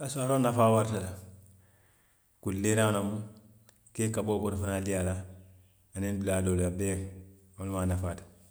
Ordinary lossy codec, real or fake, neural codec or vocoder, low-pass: none; real; none; none